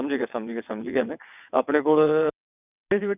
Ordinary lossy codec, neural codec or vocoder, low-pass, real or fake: none; vocoder, 22.05 kHz, 80 mel bands, WaveNeXt; 3.6 kHz; fake